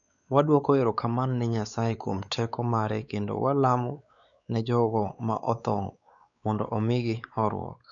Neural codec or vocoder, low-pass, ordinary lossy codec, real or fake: codec, 16 kHz, 4 kbps, X-Codec, WavLM features, trained on Multilingual LibriSpeech; 7.2 kHz; none; fake